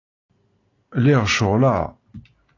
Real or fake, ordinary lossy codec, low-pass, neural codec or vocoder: real; AAC, 32 kbps; 7.2 kHz; none